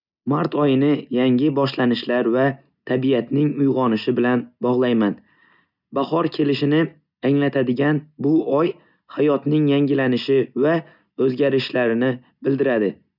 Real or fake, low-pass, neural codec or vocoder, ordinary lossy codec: real; 5.4 kHz; none; none